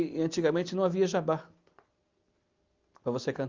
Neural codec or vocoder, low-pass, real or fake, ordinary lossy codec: none; 7.2 kHz; real; Opus, 32 kbps